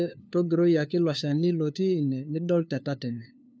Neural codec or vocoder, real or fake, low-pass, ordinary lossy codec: codec, 16 kHz, 2 kbps, FunCodec, trained on LibriTTS, 25 frames a second; fake; none; none